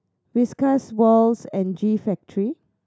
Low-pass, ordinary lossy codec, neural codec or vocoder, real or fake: none; none; none; real